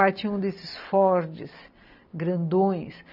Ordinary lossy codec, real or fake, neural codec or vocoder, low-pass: none; real; none; 5.4 kHz